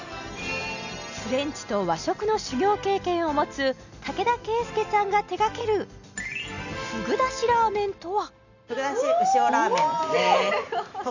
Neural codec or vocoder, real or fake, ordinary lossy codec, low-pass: none; real; none; 7.2 kHz